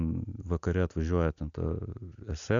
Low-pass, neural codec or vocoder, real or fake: 7.2 kHz; none; real